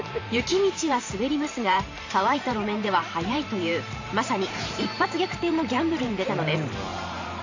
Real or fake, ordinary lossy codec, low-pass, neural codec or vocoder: fake; AAC, 48 kbps; 7.2 kHz; vocoder, 44.1 kHz, 128 mel bands every 512 samples, BigVGAN v2